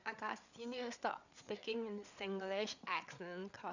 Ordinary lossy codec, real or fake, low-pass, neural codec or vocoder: none; fake; 7.2 kHz; codec, 16 kHz, 8 kbps, FreqCodec, larger model